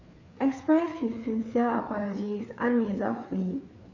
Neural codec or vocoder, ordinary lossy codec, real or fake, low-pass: codec, 16 kHz, 4 kbps, FreqCodec, larger model; none; fake; 7.2 kHz